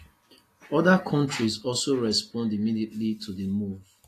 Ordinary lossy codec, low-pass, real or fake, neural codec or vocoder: AAC, 48 kbps; 14.4 kHz; real; none